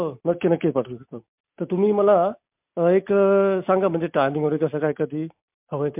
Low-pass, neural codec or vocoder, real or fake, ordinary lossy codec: 3.6 kHz; none; real; MP3, 32 kbps